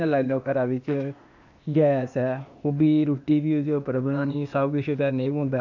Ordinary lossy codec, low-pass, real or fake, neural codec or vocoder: none; 7.2 kHz; fake; codec, 16 kHz, 0.8 kbps, ZipCodec